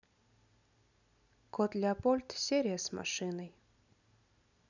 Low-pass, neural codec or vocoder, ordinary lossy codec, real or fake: 7.2 kHz; none; none; real